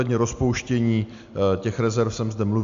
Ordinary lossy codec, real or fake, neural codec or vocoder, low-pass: MP3, 64 kbps; real; none; 7.2 kHz